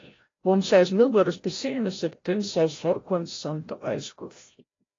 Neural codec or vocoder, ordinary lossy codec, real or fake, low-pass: codec, 16 kHz, 0.5 kbps, FreqCodec, larger model; AAC, 32 kbps; fake; 7.2 kHz